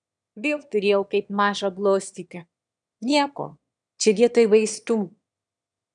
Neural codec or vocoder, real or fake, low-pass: autoencoder, 22.05 kHz, a latent of 192 numbers a frame, VITS, trained on one speaker; fake; 9.9 kHz